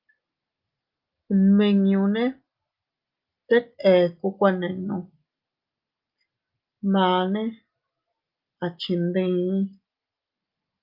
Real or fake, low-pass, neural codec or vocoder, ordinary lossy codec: real; 5.4 kHz; none; Opus, 24 kbps